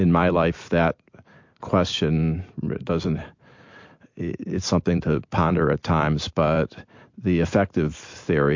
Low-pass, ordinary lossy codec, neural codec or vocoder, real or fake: 7.2 kHz; MP3, 48 kbps; vocoder, 44.1 kHz, 128 mel bands every 256 samples, BigVGAN v2; fake